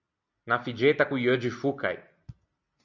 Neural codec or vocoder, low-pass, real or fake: none; 7.2 kHz; real